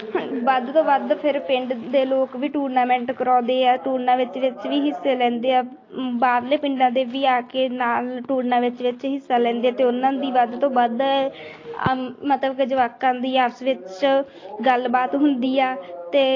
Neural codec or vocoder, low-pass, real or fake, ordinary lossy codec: none; 7.2 kHz; real; AAC, 32 kbps